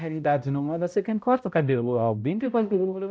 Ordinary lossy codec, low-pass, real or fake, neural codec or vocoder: none; none; fake; codec, 16 kHz, 0.5 kbps, X-Codec, HuBERT features, trained on balanced general audio